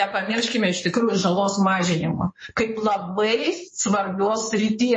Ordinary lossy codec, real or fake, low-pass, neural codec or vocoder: MP3, 32 kbps; fake; 9.9 kHz; codec, 16 kHz in and 24 kHz out, 2.2 kbps, FireRedTTS-2 codec